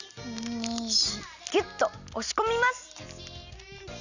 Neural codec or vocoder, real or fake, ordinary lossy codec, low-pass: none; real; none; 7.2 kHz